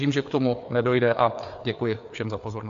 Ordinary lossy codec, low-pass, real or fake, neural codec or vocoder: AAC, 64 kbps; 7.2 kHz; fake; codec, 16 kHz, 4 kbps, FreqCodec, larger model